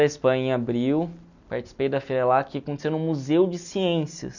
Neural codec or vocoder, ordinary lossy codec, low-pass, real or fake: none; none; 7.2 kHz; real